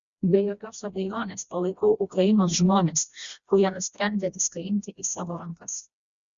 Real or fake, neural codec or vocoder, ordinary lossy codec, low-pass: fake; codec, 16 kHz, 1 kbps, FreqCodec, smaller model; Opus, 64 kbps; 7.2 kHz